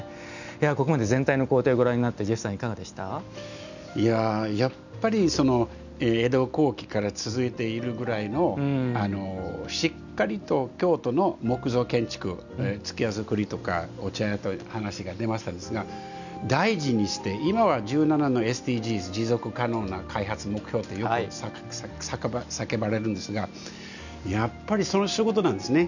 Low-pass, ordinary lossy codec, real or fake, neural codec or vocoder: 7.2 kHz; none; real; none